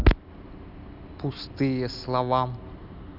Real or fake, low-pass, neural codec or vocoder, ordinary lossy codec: real; 5.4 kHz; none; none